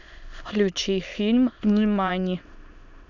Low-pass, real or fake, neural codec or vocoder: 7.2 kHz; fake; autoencoder, 22.05 kHz, a latent of 192 numbers a frame, VITS, trained on many speakers